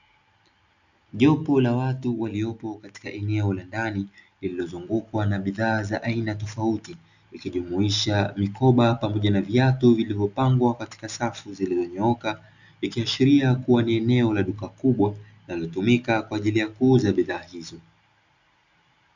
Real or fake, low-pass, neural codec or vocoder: real; 7.2 kHz; none